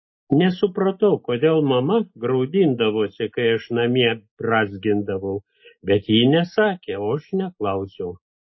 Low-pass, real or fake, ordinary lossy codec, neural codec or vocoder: 7.2 kHz; real; MP3, 24 kbps; none